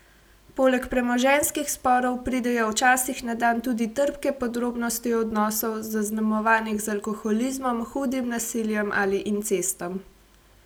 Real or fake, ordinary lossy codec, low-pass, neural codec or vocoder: real; none; none; none